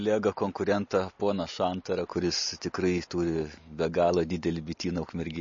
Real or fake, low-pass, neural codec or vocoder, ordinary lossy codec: real; 7.2 kHz; none; MP3, 32 kbps